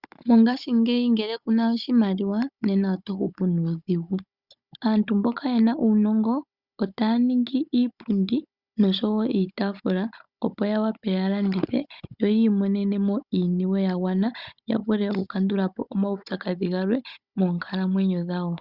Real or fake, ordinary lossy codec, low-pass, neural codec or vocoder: fake; Opus, 64 kbps; 5.4 kHz; codec, 16 kHz, 16 kbps, FunCodec, trained on Chinese and English, 50 frames a second